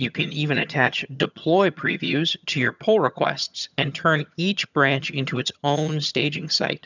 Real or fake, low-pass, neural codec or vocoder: fake; 7.2 kHz; vocoder, 22.05 kHz, 80 mel bands, HiFi-GAN